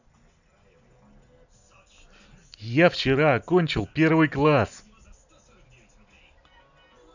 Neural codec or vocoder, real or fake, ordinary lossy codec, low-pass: none; real; none; 7.2 kHz